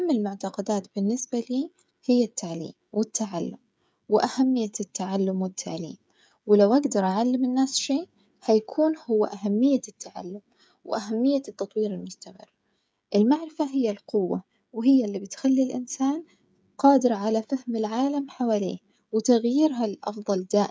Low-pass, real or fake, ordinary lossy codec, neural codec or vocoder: none; fake; none; codec, 16 kHz, 16 kbps, FreqCodec, smaller model